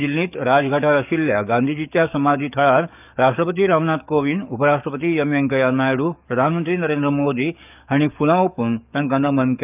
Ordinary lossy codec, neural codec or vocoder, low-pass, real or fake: none; codec, 44.1 kHz, 7.8 kbps, DAC; 3.6 kHz; fake